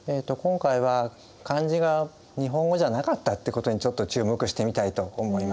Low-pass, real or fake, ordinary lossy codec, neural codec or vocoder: none; real; none; none